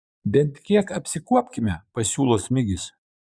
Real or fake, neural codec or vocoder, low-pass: fake; vocoder, 22.05 kHz, 80 mel bands, Vocos; 9.9 kHz